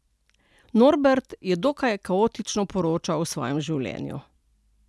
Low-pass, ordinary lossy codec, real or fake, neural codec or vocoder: none; none; real; none